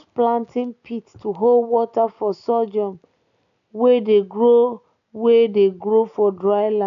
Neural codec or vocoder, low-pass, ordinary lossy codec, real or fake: none; 7.2 kHz; none; real